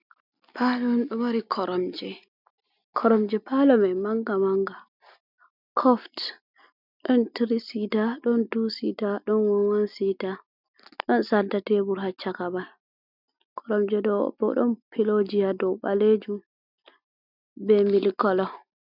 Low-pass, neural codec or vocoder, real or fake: 5.4 kHz; none; real